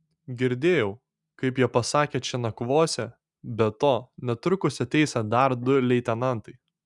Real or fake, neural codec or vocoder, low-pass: real; none; 10.8 kHz